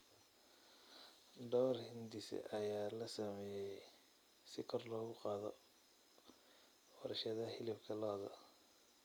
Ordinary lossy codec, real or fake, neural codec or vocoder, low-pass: none; real; none; none